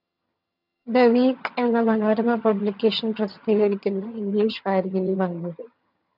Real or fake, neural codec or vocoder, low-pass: fake; vocoder, 22.05 kHz, 80 mel bands, HiFi-GAN; 5.4 kHz